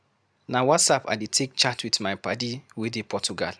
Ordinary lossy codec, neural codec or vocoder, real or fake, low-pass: none; none; real; none